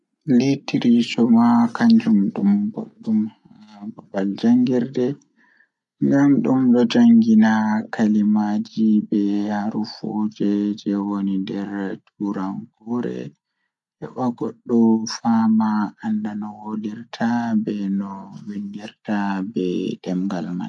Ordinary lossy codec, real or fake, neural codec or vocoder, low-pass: none; real; none; 10.8 kHz